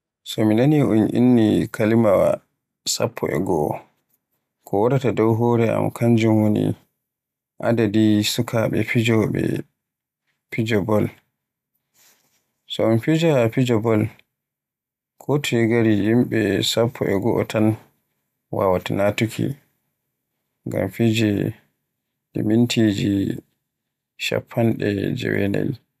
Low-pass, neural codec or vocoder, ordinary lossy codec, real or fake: 14.4 kHz; none; none; real